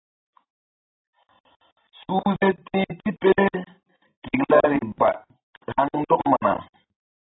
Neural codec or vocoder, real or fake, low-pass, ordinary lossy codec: none; real; 7.2 kHz; AAC, 16 kbps